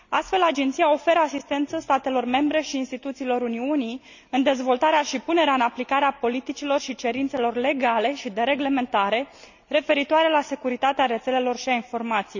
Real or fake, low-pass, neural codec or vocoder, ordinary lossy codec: real; 7.2 kHz; none; none